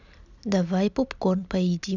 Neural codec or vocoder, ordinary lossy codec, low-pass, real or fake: none; none; 7.2 kHz; real